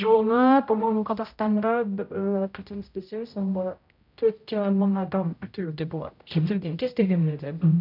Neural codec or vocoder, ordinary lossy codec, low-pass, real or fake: codec, 16 kHz, 0.5 kbps, X-Codec, HuBERT features, trained on general audio; none; 5.4 kHz; fake